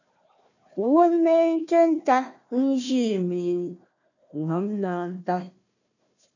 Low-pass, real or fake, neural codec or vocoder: 7.2 kHz; fake; codec, 16 kHz, 1 kbps, FunCodec, trained on Chinese and English, 50 frames a second